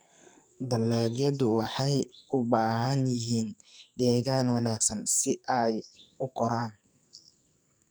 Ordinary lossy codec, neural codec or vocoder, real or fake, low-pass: none; codec, 44.1 kHz, 2.6 kbps, SNAC; fake; none